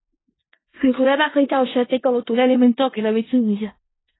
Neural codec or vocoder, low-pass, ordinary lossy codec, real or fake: codec, 16 kHz in and 24 kHz out, 0.4 kbps, LongCat-Audio-Codec, four codebook decoder; 7.2 kHz; AAC, 16 kbps; fake